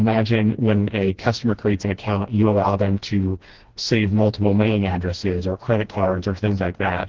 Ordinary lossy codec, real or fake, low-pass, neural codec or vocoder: Opus, 16 kbps; fake; 7.2 kHz; codec, 16 kHz, 1 kbps, FreqCodec, smaller model